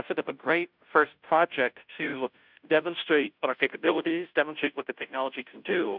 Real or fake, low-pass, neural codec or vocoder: fake; 5.4 kHz; codec, 16 kHz, 0.5 kbps, FunCodec, trained on Chinese and English, 25 frames a second